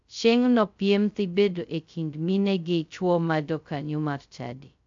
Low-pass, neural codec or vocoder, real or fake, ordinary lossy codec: 7.2 kHz; codec, 16 kHz, 0.2 kbps, FocalCodec; fake; AAC, 64 kbps